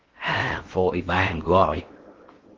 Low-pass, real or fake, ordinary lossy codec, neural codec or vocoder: 7.2 kHz; fake; Opus, 16 kbps; codec, 16 kHz in and 24 kHz out, 0.8 kbps, FocalCodec, streaming, 65536 codes